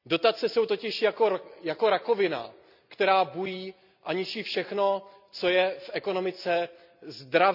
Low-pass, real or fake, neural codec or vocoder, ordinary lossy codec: 5.4 kHz; real; none; none